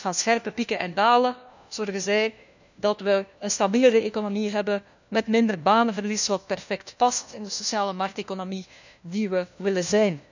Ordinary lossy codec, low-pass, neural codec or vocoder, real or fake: none; 7.2 kHz; codec, 16 kHz, 1 kbps, FunCodec, trained on LibriTTS, 50 frames a second; fake